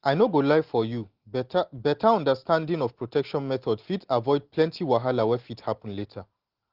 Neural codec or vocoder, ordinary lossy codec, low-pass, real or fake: none; Opus, 16 kbps; 5.4 kHz; real